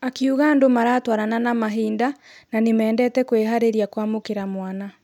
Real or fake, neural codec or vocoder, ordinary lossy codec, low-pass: real; none; none; 19.8 kHz